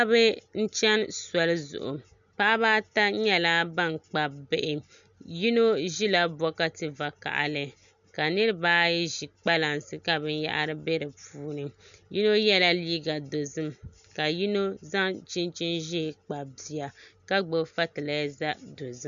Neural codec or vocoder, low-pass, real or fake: none; 7.2 kHz; real